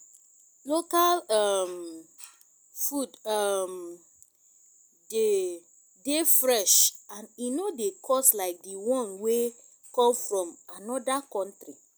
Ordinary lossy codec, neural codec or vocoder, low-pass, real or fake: none; none; none; real